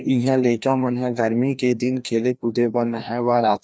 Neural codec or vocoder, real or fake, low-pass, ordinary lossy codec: codec, 16 kHz, 1 kbps, FreqCodec, larger model; fake; none; none